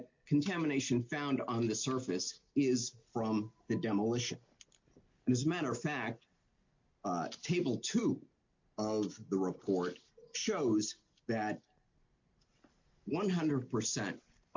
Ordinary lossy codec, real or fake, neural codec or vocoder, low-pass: MP3, 48 kbps; real; none; 7.2 kHz